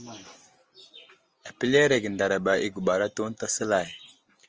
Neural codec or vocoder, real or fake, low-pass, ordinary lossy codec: none; real; 7.2 kHz; Opus, 24 kbps